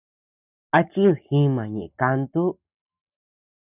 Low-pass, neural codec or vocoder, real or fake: 3.6 kHz; none; real